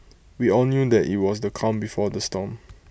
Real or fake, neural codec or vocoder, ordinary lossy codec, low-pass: real; none; none; none